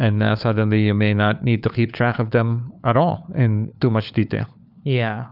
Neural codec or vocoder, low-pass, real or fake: codec, 16 kHz, 4 kbps, FunCodec, trained on LibriTTS, 50 frames a second; 5.4 kHz; fake